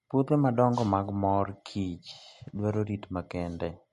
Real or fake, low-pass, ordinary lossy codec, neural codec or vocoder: real; 14.4 kHz; MP3, 48 kbps; none